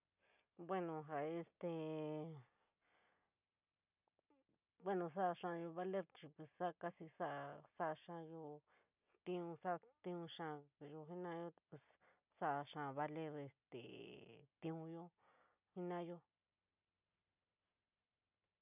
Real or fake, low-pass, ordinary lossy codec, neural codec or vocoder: real; 3.6 kHz; none; none